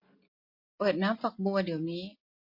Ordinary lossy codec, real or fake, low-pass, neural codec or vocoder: MP3, 32 kbps; real; 5.4 kHz; none